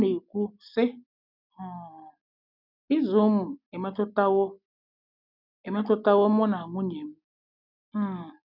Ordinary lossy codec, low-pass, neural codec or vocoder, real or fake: none; 5.4 kHz; none; real